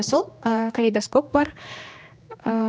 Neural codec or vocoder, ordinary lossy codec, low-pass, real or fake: codec, 16 kHz, 2 kbps, X-Codec, HuBERT features, trained on general audio; none; none; fake